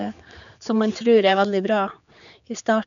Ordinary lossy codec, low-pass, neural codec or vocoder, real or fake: none; 7.2 kHz; codec, 16 kHz, 4 kbps, X-Codec, HuBERT features, trained on general audio; fake